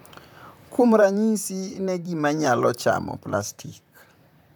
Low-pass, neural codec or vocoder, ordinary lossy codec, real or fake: none; vocoder, 44.1 kHz, 128 mel bands, Pupu-Vocoder; none; fake